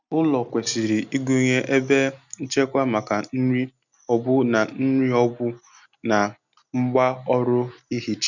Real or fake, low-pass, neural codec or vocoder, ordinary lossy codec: real; 7.2 kHz; none; none